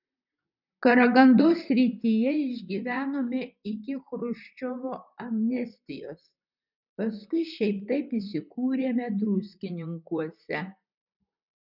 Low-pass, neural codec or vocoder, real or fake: 5.4 kHz; vocoder, 44.1 kHz, 128 mel bands, Pupu-Vocoder; fake